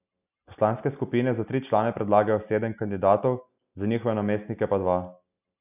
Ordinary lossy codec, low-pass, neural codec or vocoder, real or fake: none; 3.6 kHz; none; real